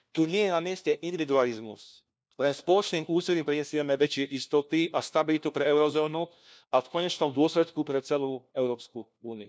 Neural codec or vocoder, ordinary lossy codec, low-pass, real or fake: codec, 16 kHz, 1 kbps, FunCodec, trained on LibriTTS, 50 frames a second; none; none; fake